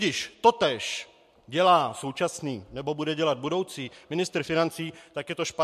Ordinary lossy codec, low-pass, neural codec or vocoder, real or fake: MP3, 64 kbps; 14.4 kHz; none; real